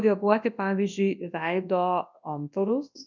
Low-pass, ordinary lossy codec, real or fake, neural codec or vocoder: 7.2 kHz; MP3, 64 kbps; fake; codec, 24 kHz, 0.9 kbps, WavTokenizer, large speech release